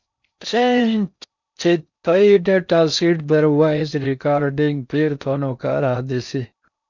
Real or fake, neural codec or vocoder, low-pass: fake; codec, 16 kHz in and 24 kHz out, 0.6 kbps, FocalCodec, streaming, 4096 codes; 7.2 kHz